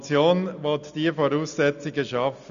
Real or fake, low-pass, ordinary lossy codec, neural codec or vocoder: real; 7.2 kHz; none; none